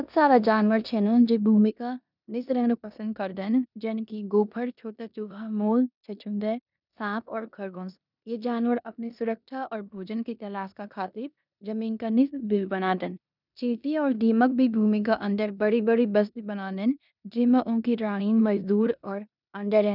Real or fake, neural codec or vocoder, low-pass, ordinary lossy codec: fake; codec, 16 kHz in and 24 kHz out, 0.9 kbps, LongCat-Audio-Codec, four codebook decoder; 5.4 kHz; none